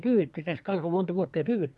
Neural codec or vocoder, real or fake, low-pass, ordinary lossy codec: codec, 24 kHz, 1 kbps, SNAC; fake; none; none